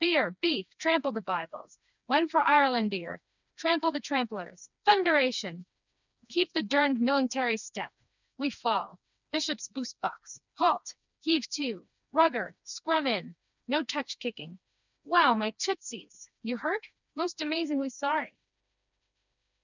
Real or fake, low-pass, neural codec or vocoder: fake; 7.2 kHz; codec, 16 kHz, 2 kbps, FreqCodec, smaller model